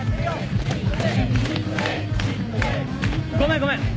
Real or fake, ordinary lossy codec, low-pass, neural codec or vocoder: real; none; none; none